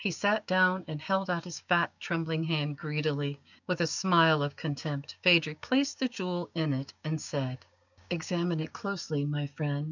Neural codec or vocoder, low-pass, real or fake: codec, 16 kHz, 6 kbps, DAC; 7.2 kHz; fake